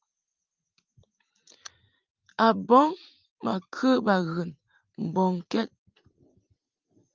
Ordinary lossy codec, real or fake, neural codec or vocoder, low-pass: Opus, 32 kbps; fake; vocoder, 44.1 kHz, 80 mel bands, Vocos; 7.2 kHz